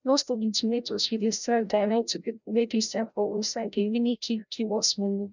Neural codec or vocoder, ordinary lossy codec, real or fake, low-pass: codec, 16 kHz, 0.5 kbps, FreqCodec, larger model; none; fake; 7.2 kHz